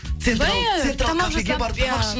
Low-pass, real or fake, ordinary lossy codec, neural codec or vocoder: none; real; none; none